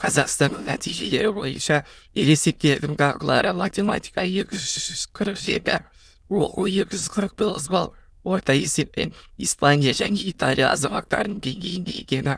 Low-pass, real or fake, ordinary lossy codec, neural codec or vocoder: none; fake; none; autoencoder, 22.05 kHz, a latent of 192 numbers a frame, VITS, trained on many speakers